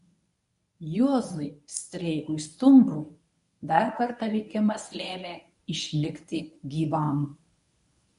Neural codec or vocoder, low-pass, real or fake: codec, 24 kHz, 0.9 kbps, WavTokenizer, medium speech release version 1; 10.8 kHz; fake